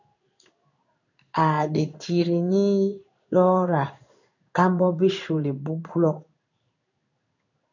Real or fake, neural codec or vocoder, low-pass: fake; codec, 16 kHz in and 24 kHz out, 1 kbps, XY-Tokenizer; 7.2 kHz